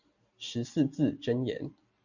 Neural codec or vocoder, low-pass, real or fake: none; 7.2 kHz; real